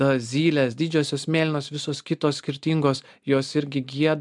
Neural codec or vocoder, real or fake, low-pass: none; real; 10.8 kHz